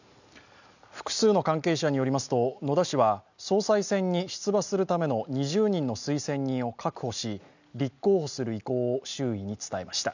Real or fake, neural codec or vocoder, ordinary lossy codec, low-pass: real; none; none; 7.2 kHz